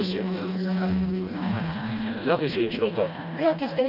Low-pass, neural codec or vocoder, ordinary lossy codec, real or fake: 5.4 kHz; codec, 16 kHz, 1 kbps, FreqCodec, smaller model; none; fake